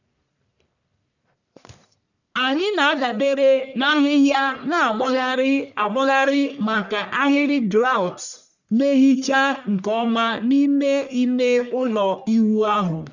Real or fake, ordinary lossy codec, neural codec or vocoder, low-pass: fake; none; codec, 44.1 kHz, 1.7 kbps, Pupu-Codec; 7.2 kHz